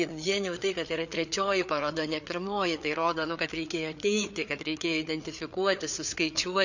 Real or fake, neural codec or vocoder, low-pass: fake; codec, 16 kHz, 4 kbps, FreqCodec, larger model; 7.2 kHz